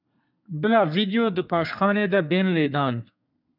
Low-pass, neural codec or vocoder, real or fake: 5.4 kHz; codec, 32 kHz, 1.9 kbps, SNAC; fake